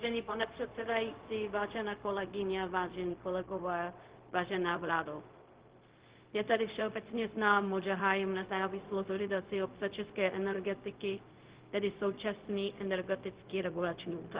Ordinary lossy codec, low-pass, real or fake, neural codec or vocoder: Opus, 16 kbps; 3.6 kHz; fake; codec, 16 kHz, 0.4 kbps, LongCat-Audio-Codec